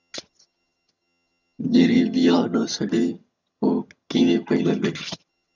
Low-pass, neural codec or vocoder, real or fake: 7.2 kHz; vocoder, 22.05 kHz, 80 mel bands, HiFi-GAN; fake